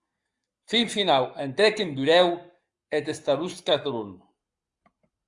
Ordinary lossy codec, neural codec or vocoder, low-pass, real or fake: Opus, 64 kbps; codec, 44.1 kHz, 7.8 kbps, Pupu-Codec; 10.8 kHz; fake